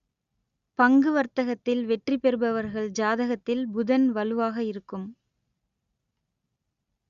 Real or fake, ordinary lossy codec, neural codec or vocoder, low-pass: real; Opus, 64 kbps; none; 7.2 kHz